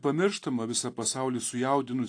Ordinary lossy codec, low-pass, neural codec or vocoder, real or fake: AAC, 48 kbps; 9.9 kHz; none; real